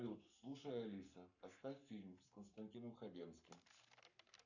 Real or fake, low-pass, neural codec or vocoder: fake; 7.2 kHz; codec, 44.1 kHz, 7.8 kbps, Pupu-Codec